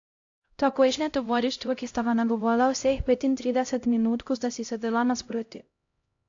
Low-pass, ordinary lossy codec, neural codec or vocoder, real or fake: 7.2 kHz; AAC, 48 kbps; codec, 16 kHz, 0.5 kbps, X-Codec, HuBERT features, trained on LibriSpeech; fake